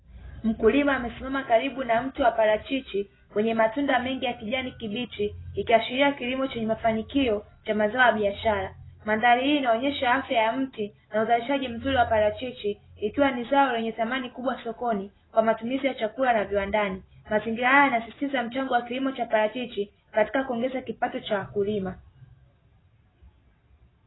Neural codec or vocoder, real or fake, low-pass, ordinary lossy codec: none; real; 7.2 kHz; AAC, 16 kbps